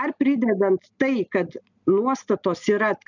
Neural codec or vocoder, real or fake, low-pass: none; real; 7.2 kHz